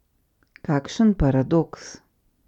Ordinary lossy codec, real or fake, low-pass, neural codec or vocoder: none; real; 19.8 kHz; none